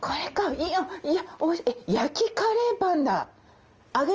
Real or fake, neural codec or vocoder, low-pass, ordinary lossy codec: real; none; 7.2 kHz; Opus, 24 kbps